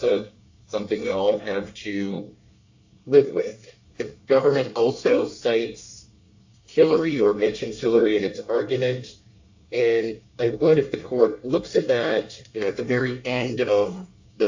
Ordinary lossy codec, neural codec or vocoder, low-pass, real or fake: AAC, 48 kbps; codec, 24 kHz, 1 kbps, SNAC; 7.2 kHz; fake